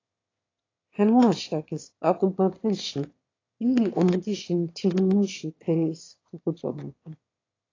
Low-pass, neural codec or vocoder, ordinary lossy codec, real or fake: 7.2 kHz; autoencoder, 22.05 kHz, a latent of 192 numbers a frame, VITS, trained on one speaker; AAC, 32 kbps; fake